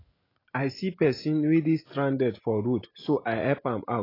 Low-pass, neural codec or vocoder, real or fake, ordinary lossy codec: 5.4 kHz; none; real; AAC, 24 kbps